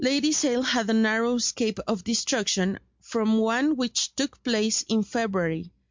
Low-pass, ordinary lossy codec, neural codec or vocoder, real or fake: 7.2 kHz; MP3, 64 kbps; none; real